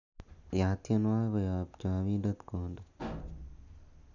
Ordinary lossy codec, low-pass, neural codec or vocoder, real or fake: none; 7.2 kHz; none; real